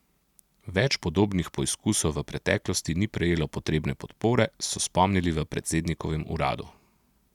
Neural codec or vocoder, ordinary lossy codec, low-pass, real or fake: none; none; 19.8 kHz; real